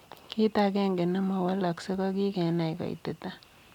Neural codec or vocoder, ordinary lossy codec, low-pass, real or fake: none; none; 19.8 kHz; real